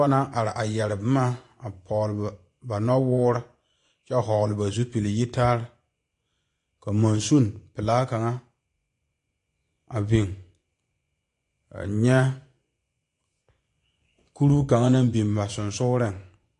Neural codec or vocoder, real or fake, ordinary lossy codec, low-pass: vocoder, 24 kHz, 100 mel bands, Vocos; fake; AAC, 48 kbps; 10.8 kHz